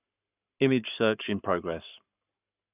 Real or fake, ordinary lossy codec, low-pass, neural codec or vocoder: fake; none; 3.6 kHz; codec, 44.1 kHz, 7.8 kbps, Pupu-Codec